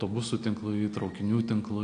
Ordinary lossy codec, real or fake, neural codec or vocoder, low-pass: AAC, 48 kbps; real; none; 9.9 kHz